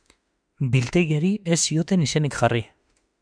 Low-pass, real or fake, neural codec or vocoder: 9.9 kHz; fake; autoencoder, 48 kHz, 32 numbers a frame, DAC-VAE, trained on Japanese speech